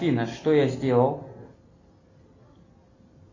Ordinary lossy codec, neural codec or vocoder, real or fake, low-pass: AAC, 48 kbps; none; real; 7.2 kHz